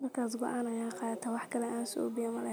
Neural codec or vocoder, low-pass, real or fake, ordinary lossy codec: none; none; real; none